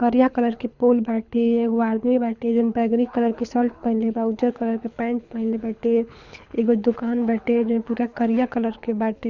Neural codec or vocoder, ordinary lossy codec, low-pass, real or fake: codec, 24 kHz, 6 kbps, HILCodec; none; 7.2 kHz; fake